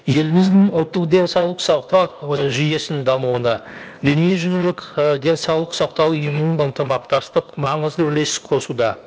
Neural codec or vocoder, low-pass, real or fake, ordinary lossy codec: codec, 16 kHz, 0.8 kbps, ZipCodec; none; fake; none